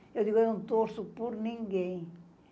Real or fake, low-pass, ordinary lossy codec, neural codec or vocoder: real; none; none; none